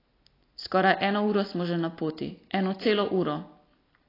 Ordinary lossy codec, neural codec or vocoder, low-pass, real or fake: AAC, 24 kbps; none; 5.4 kHz; real